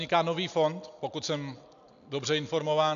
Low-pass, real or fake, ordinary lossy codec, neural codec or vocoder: 7.2 kHz; real; AAC, 96 kbps; none